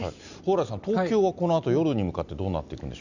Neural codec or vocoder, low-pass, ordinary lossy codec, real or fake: none; 7.2 kHz; none; real